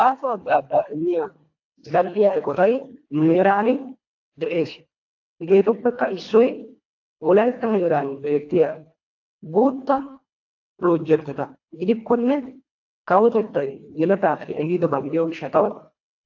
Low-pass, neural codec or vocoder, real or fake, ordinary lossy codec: 7.2 kHz; codec, 24 kHz, 1.5 kbps, HILCodec; fake; AAC, 48 kbps